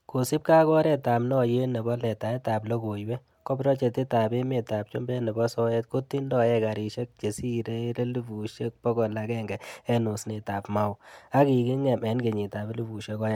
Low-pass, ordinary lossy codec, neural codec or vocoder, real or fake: 19.8 kHz; MP3, 96 kbps; none; real